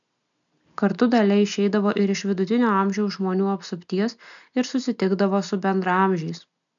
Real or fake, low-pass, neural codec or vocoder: real; 7.2 kHz; none